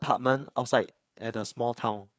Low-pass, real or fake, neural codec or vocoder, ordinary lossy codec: none; fake; codec, 16 kHz, 4 kbps, FreqCodec, larger model; none